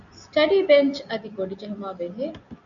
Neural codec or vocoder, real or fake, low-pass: none; real; 7.2 kHz